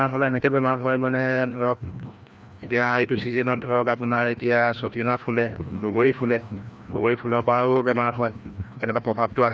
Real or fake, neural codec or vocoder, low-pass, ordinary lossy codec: fake; codec, 16 kHz, 1 kbps, FreqCodec, larger model; none; none